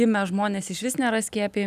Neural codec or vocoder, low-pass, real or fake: none; 14.4 kHz; real